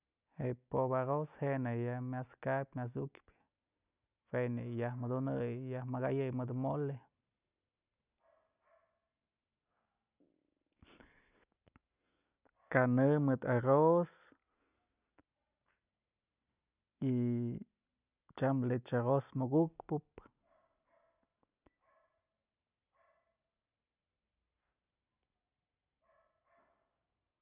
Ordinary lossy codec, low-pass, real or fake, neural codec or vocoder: none; 3.6 kHz; real; none